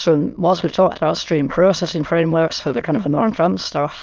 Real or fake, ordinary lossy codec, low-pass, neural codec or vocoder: fake; Opus, 24 kbps; 7.2 kHz; autoencoder, 22.05 kHz, a latent of 192 numbers a frame, VITS, trained on many speakers